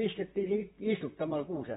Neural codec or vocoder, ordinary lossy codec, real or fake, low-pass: vocoder, 44.1 kHz, 128 mel bands, Pupu-Vocoder; AAC, 16 kbps; fake; 19.8 kHz